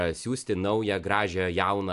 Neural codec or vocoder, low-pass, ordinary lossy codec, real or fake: none; 10.8 kHz; AAC, 96 kbps; real